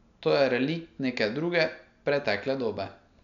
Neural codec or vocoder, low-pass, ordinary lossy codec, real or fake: none; 7.2 kHz; none; real